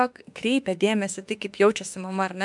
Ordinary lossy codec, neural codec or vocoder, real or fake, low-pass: MP3, 96 kbps; autoencoder, 48 kHz, 32 numbers a frame, DAC-VAE, trained on Japanese speech; fake; 10.8 kHz